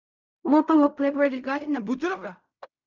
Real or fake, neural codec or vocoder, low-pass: fake; codec, 16 kHz in and 24 kHz out, 0.4 kbps, LongCat-Audio-Codec, fine tuned four codebook decoder; 7.2 kHz